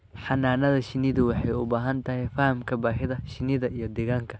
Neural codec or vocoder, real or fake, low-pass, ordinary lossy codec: none; real; none; none